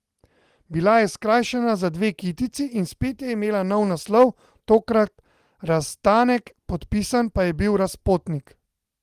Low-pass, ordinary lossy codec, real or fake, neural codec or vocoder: 14.4 kHz; Opus, 24 kbps; real; none